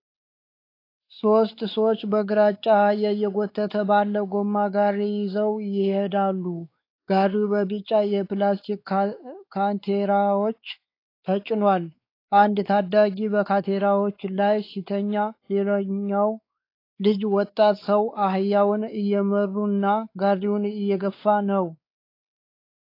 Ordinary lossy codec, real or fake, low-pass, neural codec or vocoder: AAC, 32 kbps; fake; 5.4 kHz; codec, 16 kHz, 4 kbps, X-Codec, WavLM features, trained on Multilingual LibriSpeech